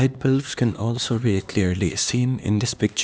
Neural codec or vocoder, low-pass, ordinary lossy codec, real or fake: codec, 16 kHz, 2 kbps, X-Codec, HuBERT features, trained on LibriSpeech; none; none; fake